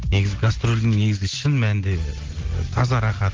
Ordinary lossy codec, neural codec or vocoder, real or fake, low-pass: Opus, 16 kbps; none; real; 7.2 kHz